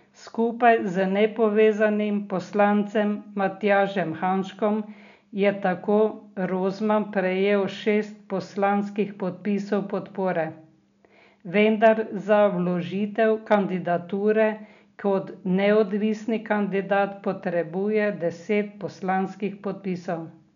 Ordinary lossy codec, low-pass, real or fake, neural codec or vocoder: none; 7.2 kHz; real; none